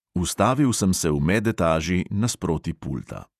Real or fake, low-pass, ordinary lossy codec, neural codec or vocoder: fake; 14.4 kHz; none; vocoder, 48 kHz, 128 mel bands, Vocos